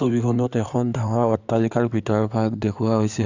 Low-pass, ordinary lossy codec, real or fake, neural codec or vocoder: 7.2 kHz; Opus, 64 kbps; fake; codec, 16 kHz in and 24 kHz out, 2.2 kbps, FireRedTTS-2 codec